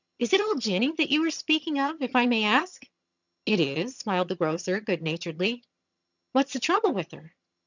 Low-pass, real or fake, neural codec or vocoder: 7.2 kHz; fake; vocoder, 22.05 kHz, 80 mel bands, HiFi-GAN